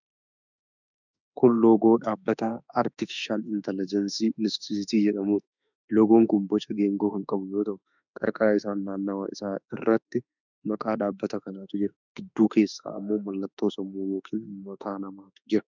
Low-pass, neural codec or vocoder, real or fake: 7.2 kHz; autoencoder, 48 kHz, 32 numbers a frame, DAC-VAE, trained on Japanese speech; fake